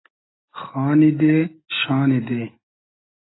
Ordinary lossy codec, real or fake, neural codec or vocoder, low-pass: AAC, 16 kbps; real; none; 7.2 kHz